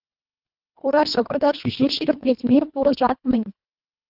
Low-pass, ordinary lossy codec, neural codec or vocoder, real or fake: 5.4 kHz; Opus, 24 kbps; codec, 24 kHz, 1.5 kbps, HILCodec; fake